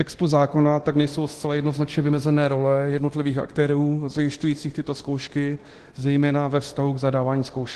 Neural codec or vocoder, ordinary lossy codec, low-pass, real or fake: codec, 24 kHz, 0.9 kbps, DualCodec; Opus, 16 kbps; 10.8 kHz; fake